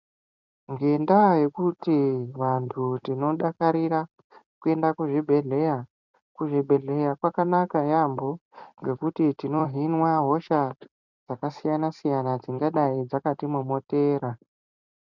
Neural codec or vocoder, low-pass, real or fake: vocoder, 44.1 kHz, 128 mel bands every 256 samples, BigVGAN v2; 7.2 kHz; fake